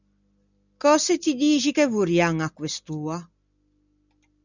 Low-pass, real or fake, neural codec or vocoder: 7.2 kHz; real; none